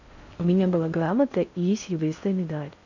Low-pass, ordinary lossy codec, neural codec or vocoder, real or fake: 7.2 kHz; none; codec, 16 kHz in and 24 kHz out, 0.6 kbps, FocalCodec, streaming, 4096 codes; fake